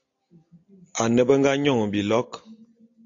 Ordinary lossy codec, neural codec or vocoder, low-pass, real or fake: AAC, 48 kbps; none; 7.2 kHz; real